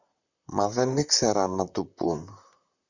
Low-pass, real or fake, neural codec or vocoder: 7.2 kHz; fake; vocoder, 44.1 kHz, 128 mel bands, Pupu-Vocoder